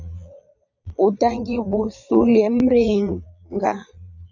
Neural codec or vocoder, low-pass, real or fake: vocoder, 22.05 kHz, 80 mel bands, Vocos; 7.2 kHz; fake